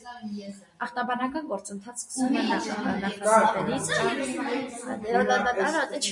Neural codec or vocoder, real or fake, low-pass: none; real; 10.8 kHz